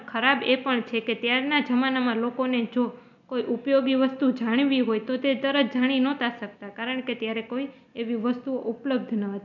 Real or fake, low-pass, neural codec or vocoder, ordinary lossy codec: real; 7.2 kHz; none; none